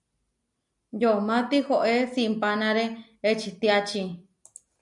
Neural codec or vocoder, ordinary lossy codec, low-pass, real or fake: none; MP3, 96 kbps; 10.8 kHz; real